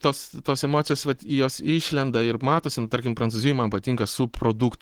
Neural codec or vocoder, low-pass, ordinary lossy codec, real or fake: codec, 44.1 kHz, 7.8 kbps, Pupu-Codec; 19.8 kHz; Opus, 16 kbps; fake